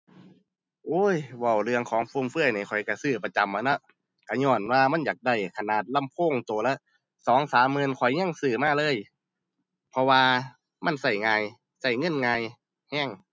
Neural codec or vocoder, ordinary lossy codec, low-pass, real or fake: none; none; none; real